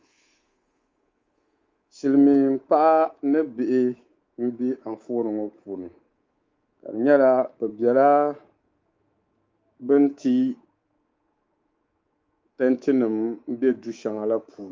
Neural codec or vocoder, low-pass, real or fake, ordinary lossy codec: codec, 24 kHz, 3.1 kbps, DualCodec; 7.2 kHz; fake; Opus, 32 kbps